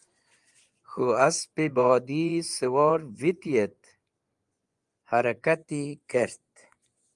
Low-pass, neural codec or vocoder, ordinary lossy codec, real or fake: 10.8 kHz; vocoder, 24 kHz, 100 mel bands, Vocos; Opus, 32 kbps; fake